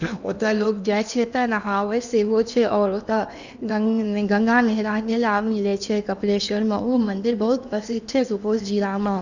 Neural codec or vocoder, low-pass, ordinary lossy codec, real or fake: codec, 16 kHz in and 24 kHz out, 0.8 kbps, FocalCodec, streaming, 65536 codes; 7.2 kHz; none; fake